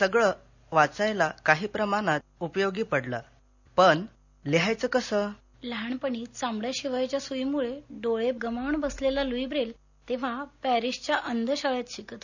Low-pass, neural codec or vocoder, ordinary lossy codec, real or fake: 7.2 kHz; none; none; real